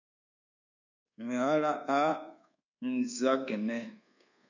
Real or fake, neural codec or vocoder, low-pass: fake; codec, 24 kHz, 1.2 kbps, DualCodec; 7.2 kHz